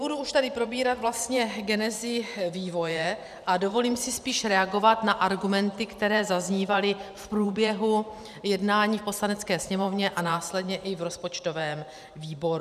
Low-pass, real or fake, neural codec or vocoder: 14.4 kHz; fake; vocoder, 44.1 kHz, 128 mel bands every 512 samples, BigVGAN v2